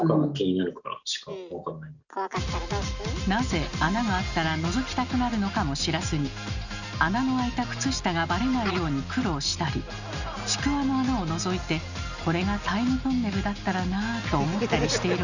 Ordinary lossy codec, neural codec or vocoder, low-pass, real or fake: none; none; 7.2 kHz; real